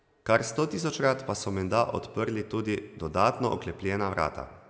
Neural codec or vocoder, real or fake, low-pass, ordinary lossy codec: none; real; none; none